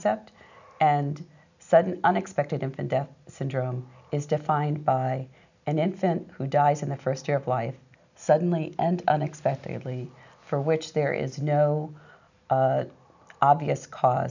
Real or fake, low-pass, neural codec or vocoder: real; 7.2 kHz; none